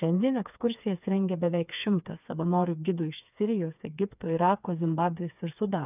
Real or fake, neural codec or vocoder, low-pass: fake; codec, 16 kHz, 4 kbps, FreqCodec, smaller model; 3.6 kHz